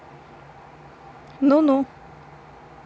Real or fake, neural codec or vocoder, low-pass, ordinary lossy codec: real; none; none; none